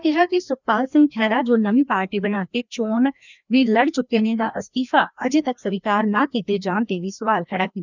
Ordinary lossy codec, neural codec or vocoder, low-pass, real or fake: none; codec, 16 kHz, 1 kbps, FreqCodec, larger model; 7.2 kHz; fake